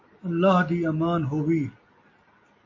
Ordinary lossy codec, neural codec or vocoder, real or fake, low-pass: MP3, 32 kbps; none; real; 7.2 kHz